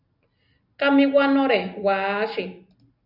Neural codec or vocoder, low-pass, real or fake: none; 5.4 kHz; real